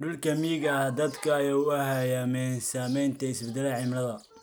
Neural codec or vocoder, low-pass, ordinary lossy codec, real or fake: none; none; none; real